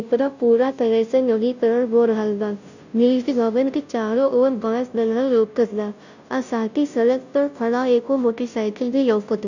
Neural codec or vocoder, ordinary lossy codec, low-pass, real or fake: codec, 16 kHz, 0.5 kbps, FunCodec, trained on Chinese and English, 25 frames a second; none; 7.2 kHz; fake